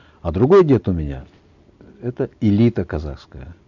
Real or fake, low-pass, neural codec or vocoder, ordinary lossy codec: real; 7.2 kHz; none; none